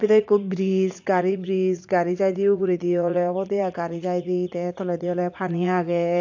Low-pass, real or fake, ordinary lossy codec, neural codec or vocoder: 7.2 kHz; fake; AAC, 48 kbps; vocoder, 44.1 kHz, 80 mel bands, Vocos